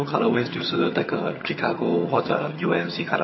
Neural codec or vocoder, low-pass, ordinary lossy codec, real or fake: vocoder, 22.05 kHz, 80 mel bands, HiFi-GAN; 7.2 kHz; MP3, 24 kbps; fake